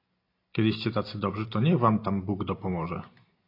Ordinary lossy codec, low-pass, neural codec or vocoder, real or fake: AAC, 32 kbps; 5.4 kHz; none; real